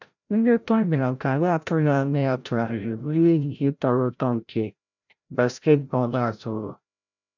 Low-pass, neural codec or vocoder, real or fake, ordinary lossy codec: 7.2 kHz; codec, 16 kHz, 0.5 kbps, FreqCodec, larger model; fake; none